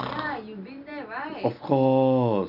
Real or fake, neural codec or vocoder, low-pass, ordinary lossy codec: real; none; 5.4 kHz; none